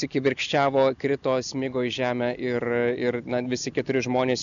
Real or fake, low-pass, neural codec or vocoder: real; 7.2 kHz; none